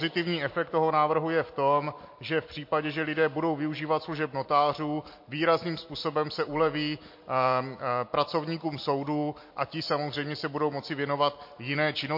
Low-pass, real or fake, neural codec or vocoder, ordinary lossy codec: 5.4 kHz; real; none; MP3, 32 kbps